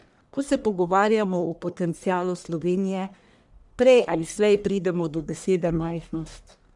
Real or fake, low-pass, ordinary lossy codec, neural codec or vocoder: fake; 10.8 kHz; none; codec, 44.1 kHz, 1.7 kbps, Pupu-Codec